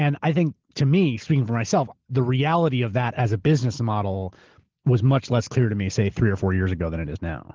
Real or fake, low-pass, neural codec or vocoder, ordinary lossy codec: real; 7.2 kHz; none; Opus, 16 kbps